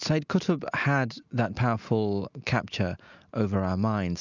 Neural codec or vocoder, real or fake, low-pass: none; real; 7.2 kHz